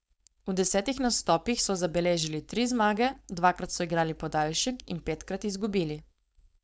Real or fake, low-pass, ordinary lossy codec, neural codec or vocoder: fake; none; none; codec, 16 kHz, 4.8 kbps, FACodec